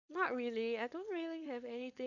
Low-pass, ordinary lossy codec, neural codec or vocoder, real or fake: 7.2 kHz; none; codec, 16 kHz, 4.8 kbps, FACodec; fake